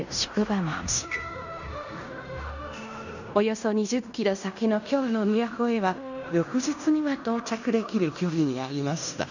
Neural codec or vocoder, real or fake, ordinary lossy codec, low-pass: codec, 16 kHz in and 24 kHz out, 0.9 kbps, LongCat-Audio-Codec, fine tuned four codebook decoder; fake; none; 7.2 kHz